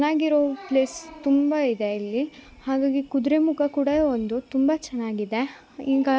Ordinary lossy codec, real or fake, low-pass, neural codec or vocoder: none; real; none; none